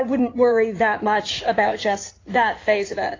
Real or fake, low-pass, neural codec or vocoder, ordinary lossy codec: fake; 7.2 kHz; codec, 16 kHz in and 24 kHz out, 2.2 kbps, FireRedTTS-2 codec; AAC, 32 kbps